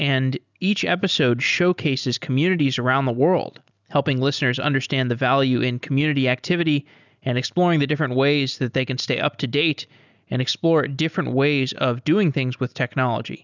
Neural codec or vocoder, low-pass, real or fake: none; 7.2 kHz; real